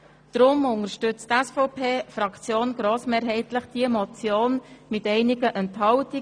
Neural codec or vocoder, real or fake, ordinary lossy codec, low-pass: none; real; none; 9.9 kHz